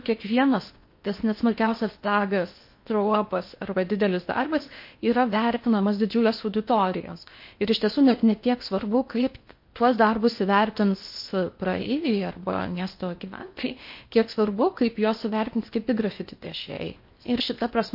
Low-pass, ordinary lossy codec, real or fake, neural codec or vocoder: 5.4 kHz; MP3, 32 kbps; fake; codec, 16 kHz in and 24 kHz out, 0.8 kbps, FocalCodec, streaming, 65536 codes